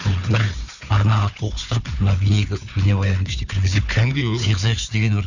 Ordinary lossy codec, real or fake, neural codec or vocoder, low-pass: none; fake; codec, 16 kHz, 4 kbps, FunCodec, trained on Chinese and English, 50 frames a second; 7.2 kHz